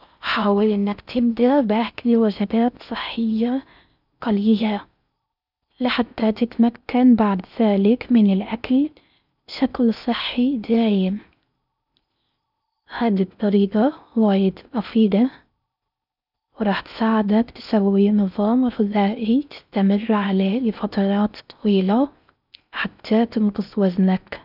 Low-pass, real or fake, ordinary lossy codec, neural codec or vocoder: 5.4 kHz; fake; none; codec, 16 kHz in and 24 kHz out, 0.6 kbps, FocalCodec, streaming, 4096 codes